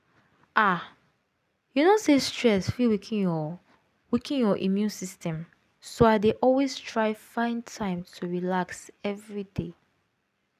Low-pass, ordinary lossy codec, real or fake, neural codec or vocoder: 14.4 kHz; none; real; none